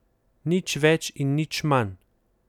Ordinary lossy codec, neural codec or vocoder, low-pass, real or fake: none; none; 19.8 kHz; real